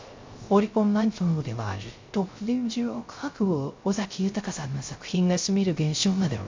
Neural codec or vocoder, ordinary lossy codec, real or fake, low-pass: codec, 16 kHz, 0.3 kbps, FocalCodec; MP3, 64 kbps; fake; 7.2 kHz